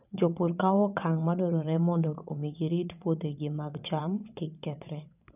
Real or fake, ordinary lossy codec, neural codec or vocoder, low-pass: fake; none; vocoder, 22.05 kHz, 80 mel bands, WaveNeXt; 3.6 kHz